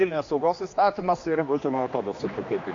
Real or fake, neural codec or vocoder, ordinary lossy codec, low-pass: fake; codec, 16 kHz, 2 kbps, X-Codec, HuBERT features, trained on general audio; AAC, 48 kbps; 7.2 kHz